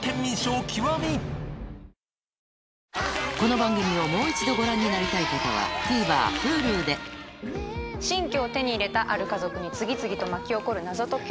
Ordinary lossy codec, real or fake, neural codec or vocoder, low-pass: none; real; none; none